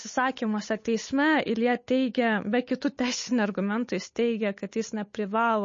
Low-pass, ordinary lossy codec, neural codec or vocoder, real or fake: 7.2 kHz; MP3, 32 kbps; codec, 16 kHz, 8 kbps, FunCodec, trained on Chinese and English, 25 frames a second; fake